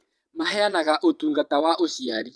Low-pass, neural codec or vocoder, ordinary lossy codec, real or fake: none; vocoder, 22.05 kHz, 80 mel bands, Vocos; none; fake